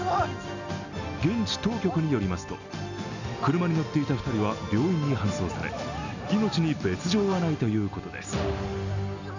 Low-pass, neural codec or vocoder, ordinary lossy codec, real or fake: 7.2 kHz; none; none; real